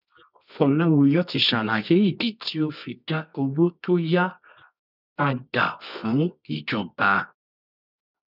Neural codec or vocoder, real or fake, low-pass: codec, 24 kHz, 0.9 kbps, WavTokenizer, medium music audio release; fake; 5.4 kHz